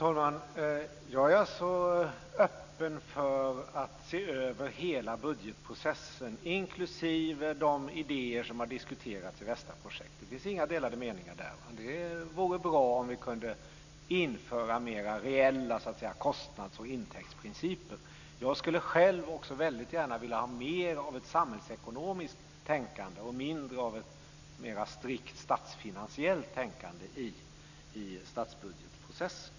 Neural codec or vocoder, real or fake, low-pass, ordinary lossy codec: none; real; 7.2 kHz; none